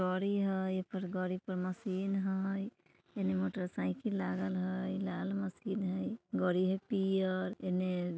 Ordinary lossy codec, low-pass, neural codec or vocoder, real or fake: none; none; none; real